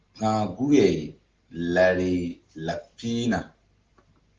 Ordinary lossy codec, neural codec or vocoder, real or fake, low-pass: Opus, 16 kbps; none; real; 7.2 kHz